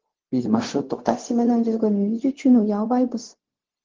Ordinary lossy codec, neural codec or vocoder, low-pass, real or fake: Opus, 16 kbps; codec, 16 kHz, 0.4 kbps, LongCat-Audio-Codec; 7.2 kHz; fake